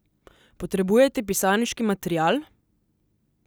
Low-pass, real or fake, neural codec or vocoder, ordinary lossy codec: none; real; none; none